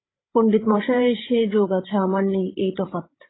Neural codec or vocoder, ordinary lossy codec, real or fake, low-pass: codec, 16 kHz, 8 kbps, FreqCodec, larger model; AAC, 16 kbps; fake; 7.2 kHz